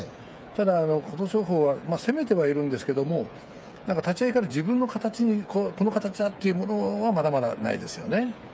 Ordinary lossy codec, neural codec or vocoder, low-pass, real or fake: none; codec, 16 kHz, 8 kbps, FreqCodec, smaller model; none; fake